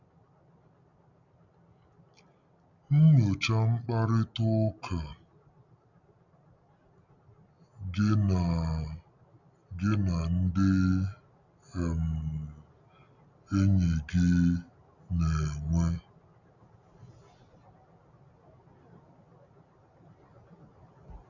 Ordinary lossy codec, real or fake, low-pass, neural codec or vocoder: none; real; 7.2 kHz; none